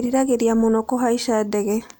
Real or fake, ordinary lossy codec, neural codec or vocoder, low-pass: real; none; none; none